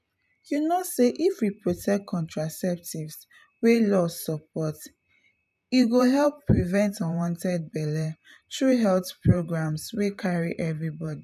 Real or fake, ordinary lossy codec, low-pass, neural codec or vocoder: fake; none; 14.4 kHz; vocoder, 44.1 kHz, 128 mel bands every 512 samples, BigVGAN v2